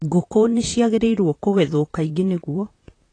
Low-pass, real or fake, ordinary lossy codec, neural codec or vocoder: 9.9 kHz; fake; AAC, 32 kbps; vocoder, 44.1 kHz, 128 mel bands, Pupu-Vocoder